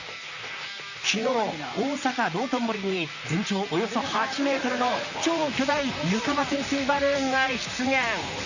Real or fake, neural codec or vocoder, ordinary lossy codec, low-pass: fake; vocoder, 44.1 kHz, 128 mel bands, Pupu-Vocoder; Opus, 64 kbps; 7.2 kHz